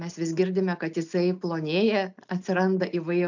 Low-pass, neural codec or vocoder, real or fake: 7.2 kHz; none; real